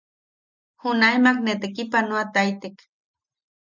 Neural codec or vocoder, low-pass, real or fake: none; 7.2 kHz; real